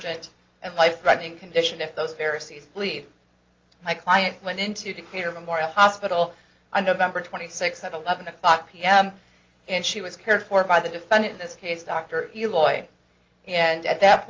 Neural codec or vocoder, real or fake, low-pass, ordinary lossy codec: none; real; 7.2 kHz; Opus, 32 kbps